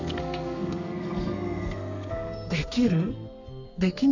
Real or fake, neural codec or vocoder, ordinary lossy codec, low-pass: fake; codec, 32 kHz, 1.9 kbps, SNAC; none; 7.2 kHz